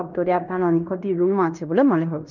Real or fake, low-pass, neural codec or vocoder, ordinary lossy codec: fake; 7.2 kHz; codec, 16 kHz in and 24 kHz out, 0.9 kbps, LongCat-Audio-Codec, fine tuned four codebook decoder; none